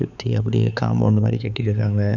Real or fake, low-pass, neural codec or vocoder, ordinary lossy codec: fake; 7.2 kHz; codec, 16 kHz, 4 kbps, X-Codec, HuBERT features, trained on balanced general audio; none